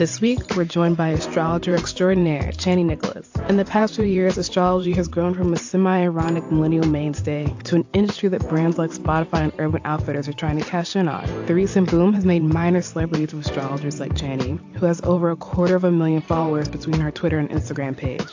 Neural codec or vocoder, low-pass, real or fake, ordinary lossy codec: vocoder, 44.1 kHz, 128 mel bands every 512 samples, BigVGAN v2; 7.2 kHz; fake; AAC, 48 kbps